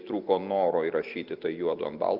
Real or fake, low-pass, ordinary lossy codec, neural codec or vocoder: real; 5.4 kHz; Opus, 32 kbps; none